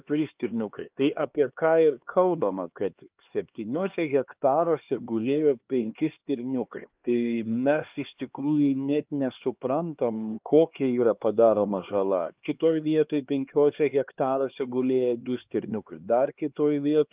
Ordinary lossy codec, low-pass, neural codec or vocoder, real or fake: Opus, 24 kbps; 3.6 kHz; codec, 16 kHz, 2 kbps, X-Codec, HuBERT features, trained on LibriSpeech; fake